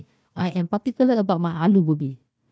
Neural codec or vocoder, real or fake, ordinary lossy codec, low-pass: codec, 16 kHz, 1 kbps, FunCodec, trained on Chinese and English, 50 frames a second; fake; none; none